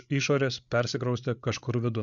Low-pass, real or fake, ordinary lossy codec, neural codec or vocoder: 7.2 kHz; fake; MP3, 96 kbps; codec, 16 kHz, 8 kbps, FreqCodec, larger model